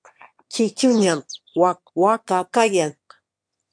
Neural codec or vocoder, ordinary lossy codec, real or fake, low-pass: autoencoder, 22.05 kHz, a latent of 192 numbers a frame, VITS, trained on one speaker; MP3, 64 kbps; fake; 9.9 kHz